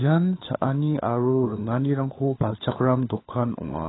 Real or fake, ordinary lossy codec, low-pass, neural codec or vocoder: fake; AAC, 16 kbps; 7.2 kHz; codec, 16 kHz, 4 kbps, FunCodec, trained on LibriTTS, 50 frames a second